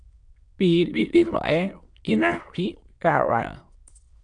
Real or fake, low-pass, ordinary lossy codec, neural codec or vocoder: fake; 9.9 kHz; AAC, 64 kbps; autoencoder, 22.05 kHz, a latent of 192 numbers a frame, VITS, trained on many speakers